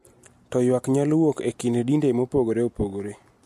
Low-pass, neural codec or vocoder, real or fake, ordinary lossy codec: 14.4 kHz; none; real; MP3, 64 kbps